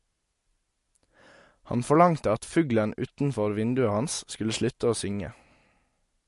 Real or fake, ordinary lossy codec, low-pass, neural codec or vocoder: real; MP3, 48 kbps; 14.4 kHz; none